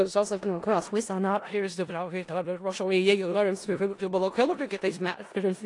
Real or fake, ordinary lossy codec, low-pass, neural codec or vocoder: fake; AAC, 48 kbps; 10.8 kHz; codec, 16 kHz in and 24 kHz out, 0.4 kbps, LongCat-Audio-Codec, four codebook decoder